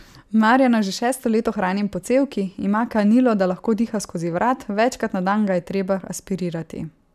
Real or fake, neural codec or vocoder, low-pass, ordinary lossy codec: real; none; 14.4 kHz; none